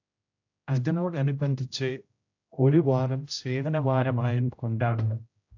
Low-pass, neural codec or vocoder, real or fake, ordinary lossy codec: 7.2 kHz; codec, 16 kHz, 0.5 kbps, X-Codec, HuBERT features, trained on general audio; fake; AAC, 48 kbps